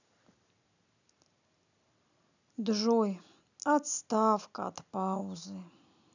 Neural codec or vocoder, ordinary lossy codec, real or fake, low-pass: none; none; real; 7.2 kHz